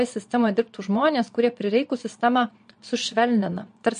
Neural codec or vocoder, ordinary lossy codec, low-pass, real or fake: vocoder, 22.05 kHz, 80 mel bands, Vocos; MP3, 48 kbps; 9.9 kHz; fake